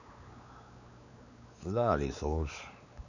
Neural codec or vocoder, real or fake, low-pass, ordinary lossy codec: codec, 16 kHz, 4 kbps, X-Codec, WavLM features, trained on Multilingual LibriSpeech; fake; 7.2 kHz; none